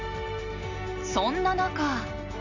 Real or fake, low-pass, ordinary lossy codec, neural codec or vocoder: real; 7.2 kHz; none; none